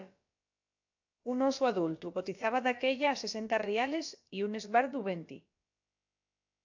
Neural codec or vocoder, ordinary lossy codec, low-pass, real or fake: codec, 16 kHz, about 1 kbps, DyCAST, with the encoder's durations; AAC, 48 kbps; 7.2 kHz; fake